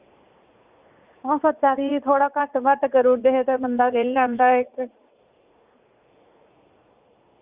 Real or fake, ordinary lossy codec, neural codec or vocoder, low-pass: fake; Opus, 64 kbps; vocoder, 44.1 kHz, 80 mel bands, Vocos; 3.6 kHz